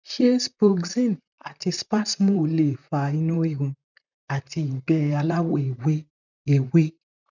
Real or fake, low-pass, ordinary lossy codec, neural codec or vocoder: fake; 7.2 kHz; none; vocoder, 44.1 kHz, 128 mel bands, Pupu-Vocoder